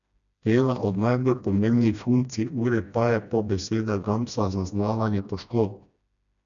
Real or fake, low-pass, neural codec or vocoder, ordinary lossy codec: fake; 7.2 kHz; codec, 16 kHz, 1 kbps, FreqCodec, smaller model; none